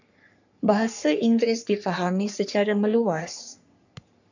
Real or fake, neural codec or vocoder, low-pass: fake; codec, 44.1 kHz, 3.4 kbps, Pupu-Codec; 7.2 kHz